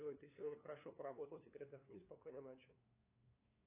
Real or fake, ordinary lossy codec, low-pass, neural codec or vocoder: fake; AAC, 24 kbps; 3.6 kHz; codec, 16 kHz, 2 kbps, FunCodec, trained on LibriTTS, 25 frames a second